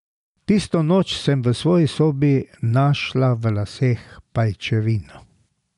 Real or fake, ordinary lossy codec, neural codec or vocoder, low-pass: real; none; none; 10.8 kHz